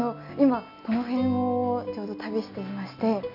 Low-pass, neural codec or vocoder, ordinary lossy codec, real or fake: 5.4 kHz; none; none; real